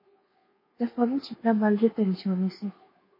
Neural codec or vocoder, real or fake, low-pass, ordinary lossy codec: codec, 24 kHz, 1.2 kbps, DualCodec; fake; 5.4 kHz; MP3, 24 kbps